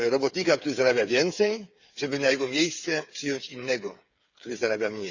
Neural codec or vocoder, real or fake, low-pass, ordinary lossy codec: codec, 16 kHz, 8 kbps, FreqCodec, smaller model; fake; 7.2 kHz; Opus, 64 kbps